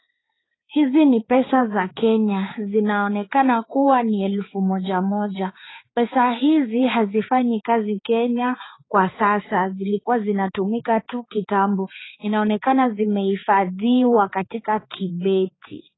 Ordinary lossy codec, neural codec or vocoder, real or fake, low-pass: AAC, 16 kbps; codec, 16 kHz, 4 kbps, X-Codec, HuBERT features, trained on balanced general audio; fake; 7.2 kHz